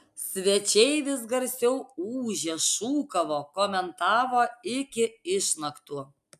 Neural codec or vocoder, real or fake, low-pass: none; real; 14.4 kHz